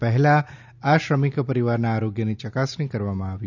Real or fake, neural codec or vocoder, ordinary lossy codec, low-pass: real; none; none; 7.2 kHz